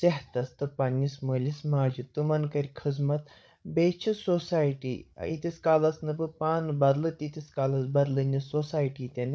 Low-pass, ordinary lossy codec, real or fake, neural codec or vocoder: none; none; fake; codec, 16 kHz, 16 kbps, FreqCodec, larger model